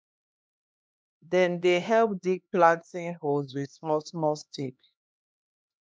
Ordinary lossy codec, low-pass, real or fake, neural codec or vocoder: none; none; fake; codec, 16 kHz, 2 kbps, X-Codec, HuBERT features, trained on LibriSpeech